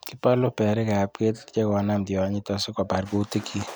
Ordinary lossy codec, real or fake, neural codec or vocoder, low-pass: none; real; none; none